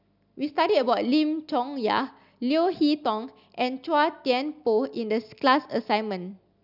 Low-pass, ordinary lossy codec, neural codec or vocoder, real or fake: 5.4 kHz; none; none; real